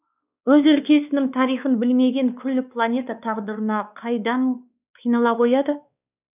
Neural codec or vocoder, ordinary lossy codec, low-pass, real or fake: codec, 16 kHz, 2 kbps, X-Codec, WavLM features, trained on Multilingual LibriSpeech; none; 3.6 kHz; fake